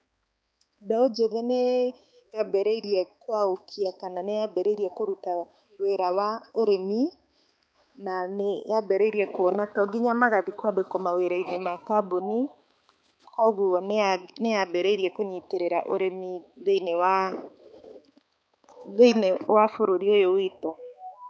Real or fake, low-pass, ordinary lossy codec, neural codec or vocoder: fake; none; none; codec, 16 kHz, 4 kbps, X-Codec, HuBERT features, trained on balanced general audio